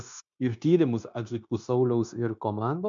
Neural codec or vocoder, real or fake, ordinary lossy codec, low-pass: codec, 16 kHz, 0.9 kbps, LongCat-Audio-Codec; fake; MP3, 96 kbps; 7.2 kHz